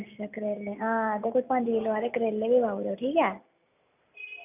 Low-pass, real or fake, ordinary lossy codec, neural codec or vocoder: 3.6 kHz; real; none; none